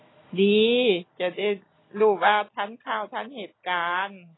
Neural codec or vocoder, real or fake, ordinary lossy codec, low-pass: none; real; AAC, 16 kbps; 7.2 kHz